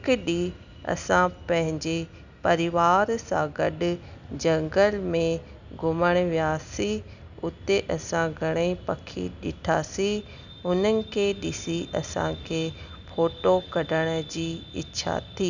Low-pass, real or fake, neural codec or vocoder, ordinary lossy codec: 7.2 kHz; real; none; none